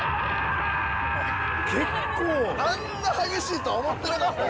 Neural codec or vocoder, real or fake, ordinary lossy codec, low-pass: none; real; none; none